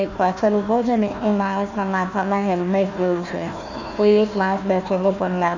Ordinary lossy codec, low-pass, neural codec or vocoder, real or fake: none; 7.2 kHz; codec, 16 kHz, 1 kbps, FunCodec, trained on Chinese and English, 50 frames a second; fake